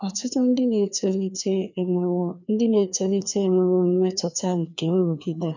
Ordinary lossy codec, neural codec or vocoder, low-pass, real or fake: none; codec, 16 kHz, 2 kbps, FreqCodec, larger model; 7.2 kHz; fake